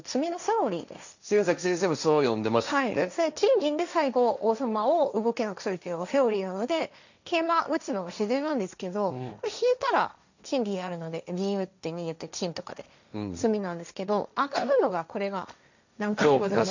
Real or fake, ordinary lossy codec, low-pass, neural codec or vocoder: fake; none; 7.2 kHz; codec, 16 kHz, 1.1 kbps, Voila-Tokenizer